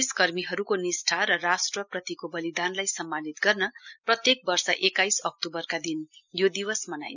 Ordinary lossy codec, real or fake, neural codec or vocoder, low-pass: none; real; none; 7.2 kHz